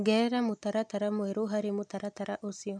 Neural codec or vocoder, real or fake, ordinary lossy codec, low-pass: none; real; none; none